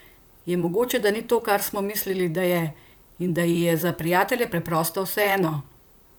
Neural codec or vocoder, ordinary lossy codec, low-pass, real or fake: vocoder, 44.1 kHz, 128 mel bands, Pupu-Vocoder; none; none; fake